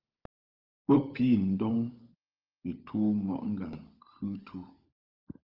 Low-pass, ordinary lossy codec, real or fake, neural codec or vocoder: 5.4 kHz; Opus, 24 kbps; fake; codec, 16 kHz, 16 kbps, FunCodec, trained on LibriTTS, 50 frames a second